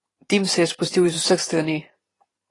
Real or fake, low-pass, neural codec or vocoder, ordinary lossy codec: fake; 10.8 kHz; vocoder, 44.1 kHz, 128 mel bands every 512 samples, BigVGAN v2; AAC, 32 kbps